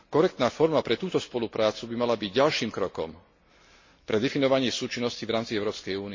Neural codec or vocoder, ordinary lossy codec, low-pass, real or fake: none; MP3, 32 kbps; 7.2 kHz; real